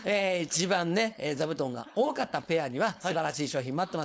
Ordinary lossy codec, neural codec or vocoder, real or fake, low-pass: none; codec, 16 kHz, 4.8 kbps, FACodec; fake; none